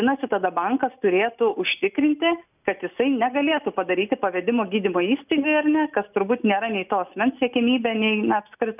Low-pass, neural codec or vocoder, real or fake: 3.6 kHz; none; real